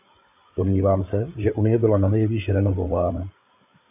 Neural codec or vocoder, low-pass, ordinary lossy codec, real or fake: codec, 16 kHz, 8 kbps, FreqCodec, larger model; 3.6 kHz; AAC, 32 kbps; fake